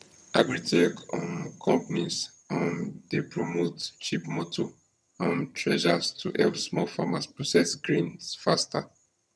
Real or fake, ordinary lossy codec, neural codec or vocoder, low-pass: fake; none; vocoder, 22.05 kHz, 80 mel bands, HiFi-GAN; none